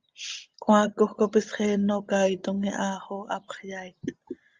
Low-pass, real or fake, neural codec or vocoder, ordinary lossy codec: 7.2 kHz; real; none; Opus, 32 kbps